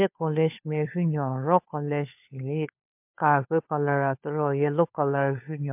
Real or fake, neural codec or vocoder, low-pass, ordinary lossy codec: fake; codec, 16 kHz, 4 kbps, FunCodec, trained on LibriTTS, 50 frames a second; 3.6 kHz; MP3, 32 kbps